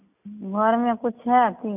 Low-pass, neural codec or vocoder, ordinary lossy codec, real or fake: 3.6 kHz; none; none; real